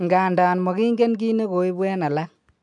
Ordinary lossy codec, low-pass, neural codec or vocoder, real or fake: none; 10.8 kHz; none; real